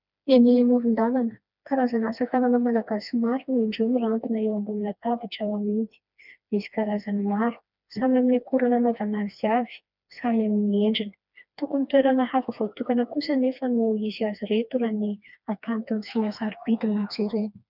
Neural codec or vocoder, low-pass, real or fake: codec, 16 kHz, 2 kbps, FreqCodec, smaller model; 5.4 kHz; fake